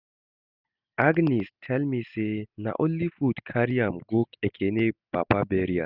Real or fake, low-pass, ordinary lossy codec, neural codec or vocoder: real; 5.4 kHz; none; none